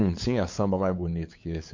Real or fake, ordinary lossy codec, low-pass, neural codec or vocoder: fake; MP3, 48 kbps; 7.2 kHz; codec, 16 kHz, 8 kbps, FunCodec, trained on LibriTTS, 25 frames a second